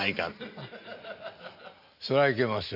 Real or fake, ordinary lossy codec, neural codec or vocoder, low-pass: real; none; none; 5.4 kHz